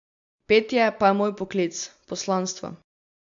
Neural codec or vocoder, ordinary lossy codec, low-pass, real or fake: none; AAC, 64 kbps; 7.2 kHz; real